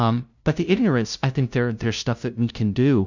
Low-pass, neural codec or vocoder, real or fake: 7.2 kHz; codec, 16 kHz, 0.5 kbps, FunCodec, trained on LibriTTS, 25 frames a second; fake